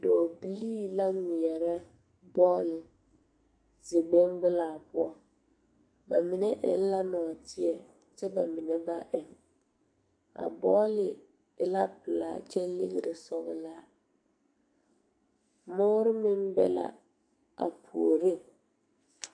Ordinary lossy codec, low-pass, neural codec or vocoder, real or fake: AAC, 64 kbps; 9.9 kHz; codec, 44.1 kHz, 2.6 kbps, SNAC; fake